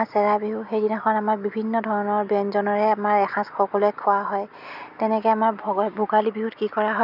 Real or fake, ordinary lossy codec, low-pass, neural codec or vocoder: real; none; 5.4 kHz; none